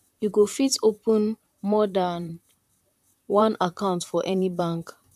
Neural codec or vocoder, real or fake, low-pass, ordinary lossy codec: vocoder, 44.1 kHz, 128 mel bands, Pupu-Vocoder; fake; 14.4 kHz; none